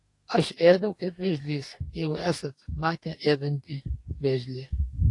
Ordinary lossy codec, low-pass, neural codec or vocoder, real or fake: AAC, 48 kbps; 10.8 kHz; codec, 44.1 kHz, 2.6 kbps, DAC; fake